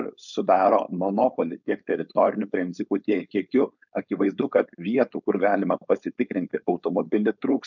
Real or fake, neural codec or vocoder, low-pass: fake; codec, 16 kHz, 4.8 kbps, FACodec; 7.2 kHz